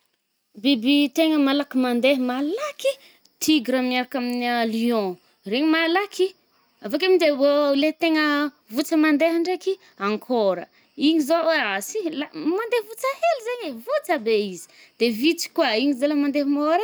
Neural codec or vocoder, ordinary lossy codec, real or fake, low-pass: none; none; real; none